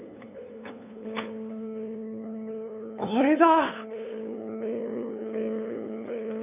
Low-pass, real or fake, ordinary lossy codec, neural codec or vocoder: 3.6 kHz; fake; none; codec, 16 kHz, 8 kbps, FunCodec, trained on LibriTTS, 25 frames a second